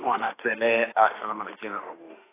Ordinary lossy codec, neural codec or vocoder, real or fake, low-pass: AAC, 16 kbps; autoencoder, 48 kHz, 32 numbers a frame, DAC-VAE, trained on Japanese speech; fake; 3.6 kHz